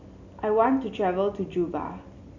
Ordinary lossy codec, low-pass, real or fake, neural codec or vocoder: none; 7.2 kHz; real; none